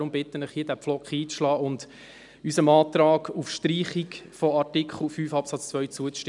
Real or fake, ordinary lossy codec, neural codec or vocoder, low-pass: real; none; none; 10.8 kHz